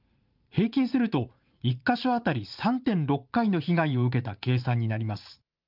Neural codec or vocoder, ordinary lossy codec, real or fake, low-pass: vocoder, 44.1 kHz, 80 mel bands, Vocos; Opus, 24 kbps; fake; 5.4 kHz